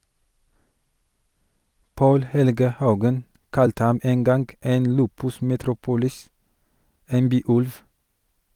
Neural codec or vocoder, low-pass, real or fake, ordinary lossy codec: vocoder, 44.1 kHz, 128 mel bands every 256 samples, BigVGAN v2; 19.8 kHz; fake; Opus, 32 kbps